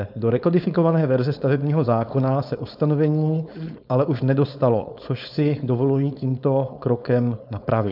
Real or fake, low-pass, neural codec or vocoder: fake; 5.4 kHz; codec, 16 kHz, 4.8 kbps, FACodec